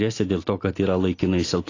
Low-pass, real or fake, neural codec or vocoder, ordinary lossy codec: 7.2 kHz; real; none; AAC, 32 kbps